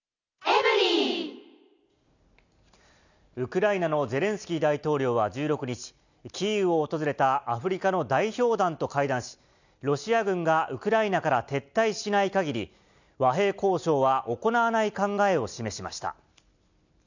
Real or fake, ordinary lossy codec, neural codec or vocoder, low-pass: real; none; none; 7.2 kHz